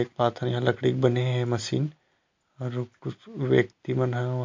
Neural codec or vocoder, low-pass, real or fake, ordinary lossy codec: none; 7.2 kHz; real; MP3, 48 kbps